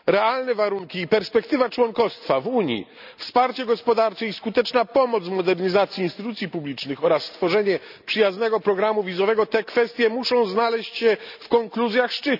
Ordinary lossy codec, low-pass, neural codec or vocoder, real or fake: none; 5.4 kHz; none; real